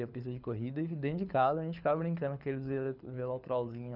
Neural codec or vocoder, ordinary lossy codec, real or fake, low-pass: codec, 24 kHz, 6 kbps, HILCodec; none; fake; 5.4 kHz